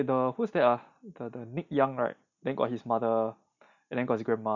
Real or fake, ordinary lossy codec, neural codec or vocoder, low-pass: real; none; none; 7.2 kHz